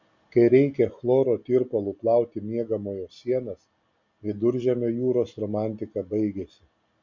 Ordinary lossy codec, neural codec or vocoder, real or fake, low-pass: MP3, 64 kbps; none; real; 7.2 kHz